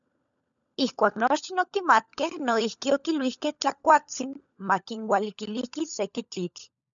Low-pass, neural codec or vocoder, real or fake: 7.2 kHz; codec, 16 kHz, 16 kbps, FunCodec, trained on LibriTTS, 50 frames a second; fake